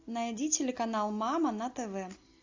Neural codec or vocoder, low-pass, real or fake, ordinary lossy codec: none; 7.2 kHz; real; AAC, 48 kbps